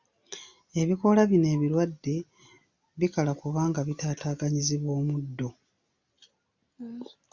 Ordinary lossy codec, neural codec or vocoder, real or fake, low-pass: Opus, 64 kbps; none; real; 7.2 kHz